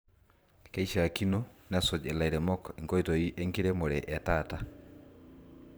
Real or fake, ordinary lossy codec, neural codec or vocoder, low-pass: fake; none; vocoder, 44.1 kHz, 128 mel bands every 512 samples, BigVGAN v2; none